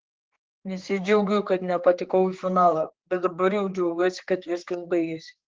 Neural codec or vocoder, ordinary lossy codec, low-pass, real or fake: codec, 16 kHz, 4 kbps, X-Codec, HuBERT features, trained on general audio; Opus, 16 kbps; 7.2 kHz; fake